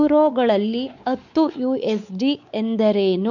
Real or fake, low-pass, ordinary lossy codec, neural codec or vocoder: fake; 7.2 kHz; none; codec, 16 kHz, 4 kbps, X-Codec, WavLM features, trained on Multilingual LibriSpeech